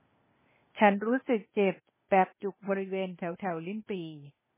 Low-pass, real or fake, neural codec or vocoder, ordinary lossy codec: 3.6 kHz; fake; codec, 16 kHz, 0.8 kbps, ZipCodec; MP3, 16 kbps